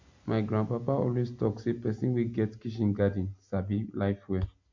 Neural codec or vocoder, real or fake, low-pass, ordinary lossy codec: none; real; 7.2 kHz; MP3, 48 kbps